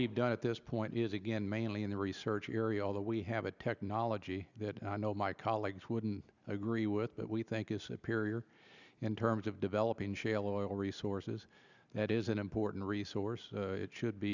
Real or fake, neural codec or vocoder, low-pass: real; none; 7.2 kHz